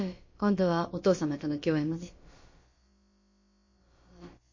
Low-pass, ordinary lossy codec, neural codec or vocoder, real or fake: 7.2 kHz; MP3, 32 kbps; codec, 16 kHz, about 1 kbps, DyCAST, with the encoder's durations; fake